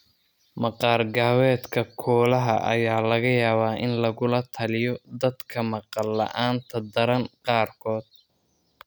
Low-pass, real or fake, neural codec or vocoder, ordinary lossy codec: none; real; none; none